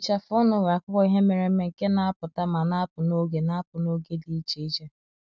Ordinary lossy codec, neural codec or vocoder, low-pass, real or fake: none; none; none; real